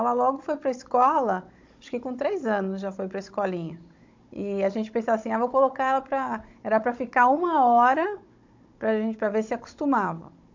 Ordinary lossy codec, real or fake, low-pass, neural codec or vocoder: MP3, 48 kbps; fake; 7.2 kHz; codec, 16 kHz, 16 kbps, FunCodec, trained on Chinese and English, 50 frames a second